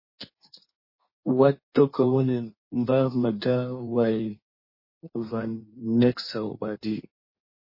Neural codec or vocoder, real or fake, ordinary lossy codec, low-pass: codec, 16 kHz in and 24 kHz out, 1.1 kbps, FireRedTTS-2 codec; fake; MP3, 24 kbps; 5.4 kHz